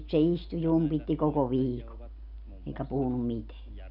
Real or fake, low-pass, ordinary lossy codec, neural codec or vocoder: real; 5.4 kHz; none; none